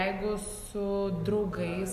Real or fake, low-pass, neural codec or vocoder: real; 14.4 kHz; none